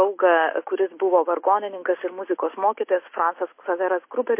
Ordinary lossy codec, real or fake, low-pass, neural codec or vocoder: MP3, 24 kbps; real; 3.6 kHz; none